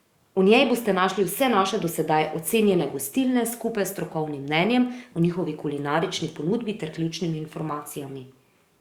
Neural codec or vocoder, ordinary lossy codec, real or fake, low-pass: codec, 44.1 kHz, 7.8 kbps, DAC; Opus, 64 kbps; fake; 19.8 kHz